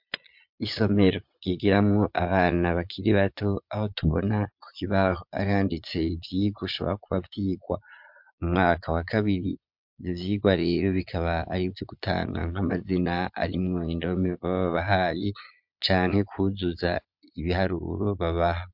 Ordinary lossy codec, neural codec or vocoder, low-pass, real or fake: MP3, 48 kbps; vocoder, 22.05 kHz, 80 mel bands, Vocos; 5.4 kHz; fake